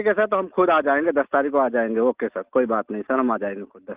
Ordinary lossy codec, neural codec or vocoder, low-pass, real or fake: Opus, 24 kbps; none; 3.6 kHz; real